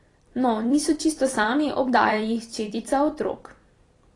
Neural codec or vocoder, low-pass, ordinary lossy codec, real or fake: vocoder, 44.1 kHz, 128 mel bands every 256 samples, BigVGAN v2; 10.8 kHz; AAC, 32 kbps; fake